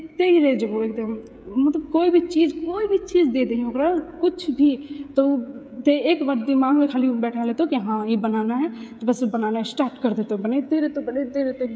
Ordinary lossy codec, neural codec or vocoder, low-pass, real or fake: none; codec, 16 kHz, 16 kbps, FreqCodec, smaller model; none; fake